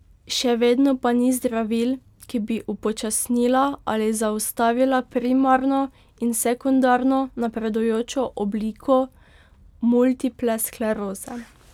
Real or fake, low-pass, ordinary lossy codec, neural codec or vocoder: real; 19.8 kHz; none; none